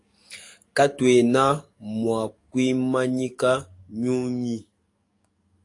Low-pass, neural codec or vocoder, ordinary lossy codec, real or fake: 10.8 kHz; codec, 44.1 kHz, 7.8 kbps, DAC; AAC, 48 kbps; fake